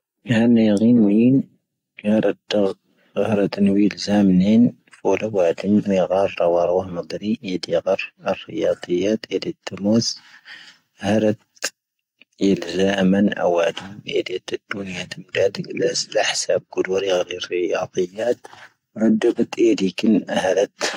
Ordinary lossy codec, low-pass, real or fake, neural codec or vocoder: AAC, 48 kbps; 19.8 kHz; real; none